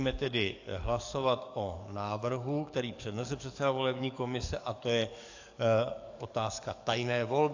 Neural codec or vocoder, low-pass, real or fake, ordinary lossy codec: codec, 44.1 kHz, 7.8 kbps, DAC; 7.2 kHz; fake; AAC, 48 kbps